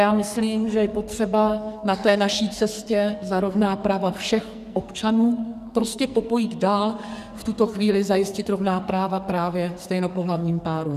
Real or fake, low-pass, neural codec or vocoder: fake; 14.4 kHz; codec, 44.1 kHz, 2.6 kbps, SNAC